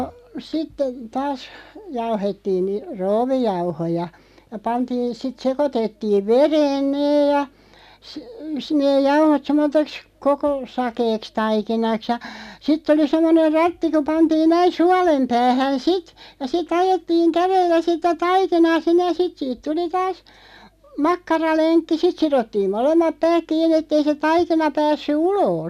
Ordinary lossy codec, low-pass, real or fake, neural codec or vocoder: none; 14.4 kHz; real; none